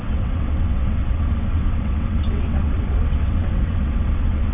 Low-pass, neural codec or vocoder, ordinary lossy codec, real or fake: 3.6 kHz; vocoder, 22.05 kHz, 80 mel bands, WaveNeXt; none; fake